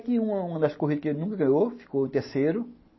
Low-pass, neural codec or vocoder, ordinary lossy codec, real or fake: 7.2 kHz; none; MP3, 24 kbps; real